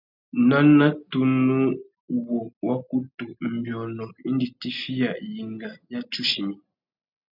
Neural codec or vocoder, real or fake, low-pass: none; real; 5.4 kHz